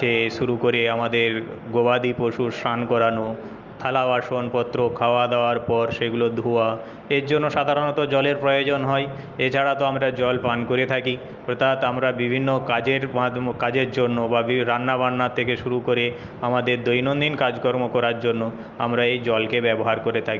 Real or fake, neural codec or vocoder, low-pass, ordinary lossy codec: real; none; 7.2 kHz; Opus, 32 kbps